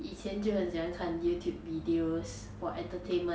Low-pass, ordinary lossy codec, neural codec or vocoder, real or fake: none; none; none; real